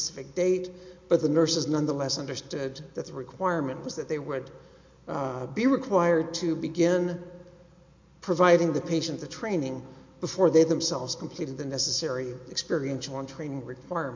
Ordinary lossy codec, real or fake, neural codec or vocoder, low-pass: MP3, 48 kbps; real; none; 7.2 kHz